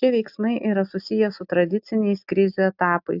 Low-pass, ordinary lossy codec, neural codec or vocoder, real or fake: 5.4 kHz; AAC, 48 kbps; none; real